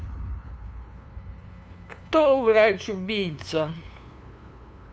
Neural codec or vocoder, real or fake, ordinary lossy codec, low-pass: codec, 16 kHz, 2 kbps, FunCodec, trained on LibriTTS, 25 frames a second; fake; none; none